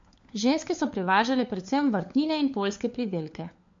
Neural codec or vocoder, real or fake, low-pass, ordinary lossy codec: codec, 16 kHz, 4 kbps, FunCodec, trained on LibriTTS, 50 frames a second; fake; 7.2 kHz; MP3, 48 kbps